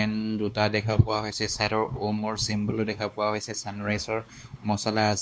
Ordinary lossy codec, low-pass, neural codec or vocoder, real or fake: none; none; codec, 16 kHz, 2 kbps, X-Codec, WavLM features, trained on Multilingual LibriSpeech; fake